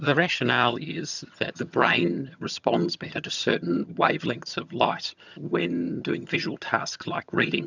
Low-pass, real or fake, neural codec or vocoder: 7.2 kHz; fake; vocoder, 22.05 kHz, 80 mel bands, HiFi-GAN